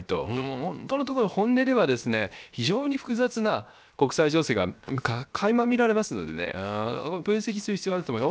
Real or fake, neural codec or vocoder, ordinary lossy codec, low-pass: fake; codec, 16 kHz, 0.7 kbps, FocalCodec; none; none